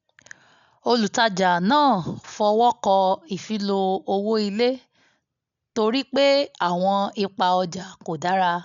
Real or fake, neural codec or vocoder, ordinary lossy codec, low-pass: real; none; none; 7.2 kHz